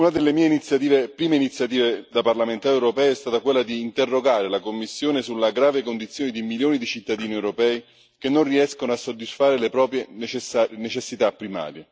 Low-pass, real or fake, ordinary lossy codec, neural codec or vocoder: none; real; none; none